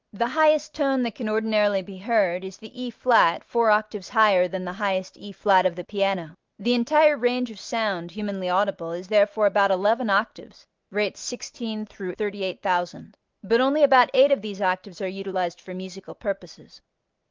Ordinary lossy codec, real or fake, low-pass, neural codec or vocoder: Opus, 32 kbps; real; 7.2 kHz; none